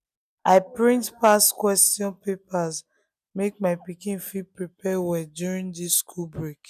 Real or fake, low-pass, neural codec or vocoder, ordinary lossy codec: real; 14.4 kHz; none; none